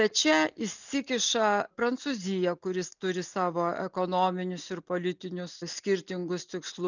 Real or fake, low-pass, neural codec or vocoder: real; 7.2 kHz; none